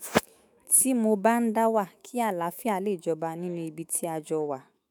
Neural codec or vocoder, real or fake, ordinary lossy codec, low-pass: autoencoder, 48 kHz, 128 numbers a frame, DAC-VAE, trained on Japanese speech; fake; none; none